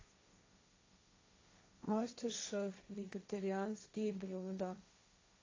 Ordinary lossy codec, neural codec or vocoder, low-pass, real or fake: AAC, 32 kbps; codec, 16 kHz, 1.1 kbps, Voila-Tokenizer; 7.2 kHz; fake